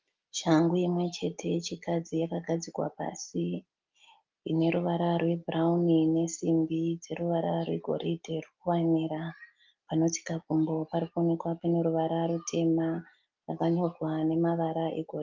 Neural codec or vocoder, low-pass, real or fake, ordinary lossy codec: none; 7.2 kHz; real; Opus, 32 kbps